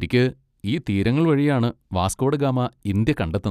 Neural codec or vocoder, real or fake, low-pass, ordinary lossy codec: none; real; 14.4 kHz; none